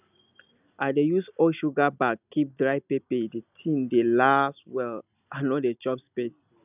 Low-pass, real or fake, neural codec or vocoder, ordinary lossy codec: 3.6 kHz; real; none; none